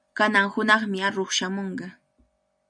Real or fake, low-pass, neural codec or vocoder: real; 9.9 kHz; none